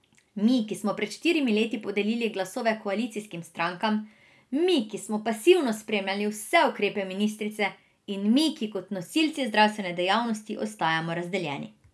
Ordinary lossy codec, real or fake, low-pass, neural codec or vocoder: none; real; none; none